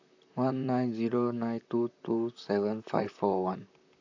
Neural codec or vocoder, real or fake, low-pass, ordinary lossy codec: vocoder, 44.1 kHz, 128 mel bands, Pupu-Vocoder; fake; 7.2 kHz; AAC, 48 kbps